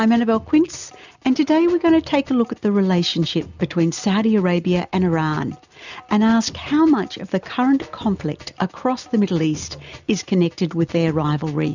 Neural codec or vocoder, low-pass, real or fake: none; 7.2 kHz; real